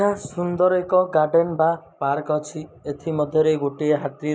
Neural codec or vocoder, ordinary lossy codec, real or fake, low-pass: none; none; real; none